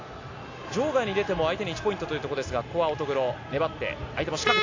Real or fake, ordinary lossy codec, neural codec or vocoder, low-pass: real; AAC, 48 kbps; none; 7.2 kHz